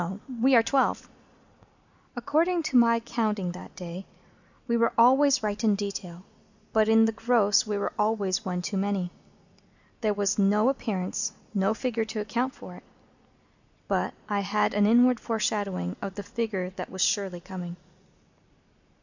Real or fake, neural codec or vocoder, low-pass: real; none; 7.2 kHz